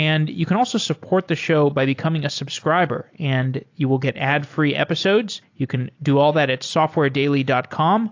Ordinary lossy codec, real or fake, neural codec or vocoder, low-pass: AAC, 48 kbps; real; none; 7.2 kHz